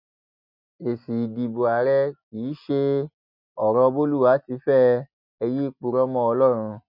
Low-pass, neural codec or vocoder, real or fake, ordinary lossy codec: 5.4 kHz; none; real; none